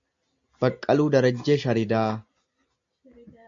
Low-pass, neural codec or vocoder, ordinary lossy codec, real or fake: 7.2 kHz; none; AAC, 64 kbps; real